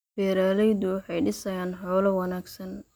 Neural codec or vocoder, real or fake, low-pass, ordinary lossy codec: none; real; none; none